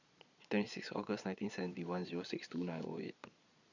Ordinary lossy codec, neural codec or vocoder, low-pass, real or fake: none; vocoder, 22.05 kHz, 80 mel bands, Vocos; 7.2 kHz; fake